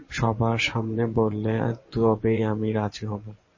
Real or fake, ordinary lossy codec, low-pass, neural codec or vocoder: fake; MP3, 32 kbps; 7.2 kHz; vocoder, 24 kHz, 100 mel bands, Vocos